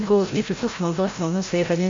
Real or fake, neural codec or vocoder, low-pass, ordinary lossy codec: fake; codec, 16 kHz, 0.5 kbps, FreqCodec, larger model; 7.2 kHz; MP3, 48 kbps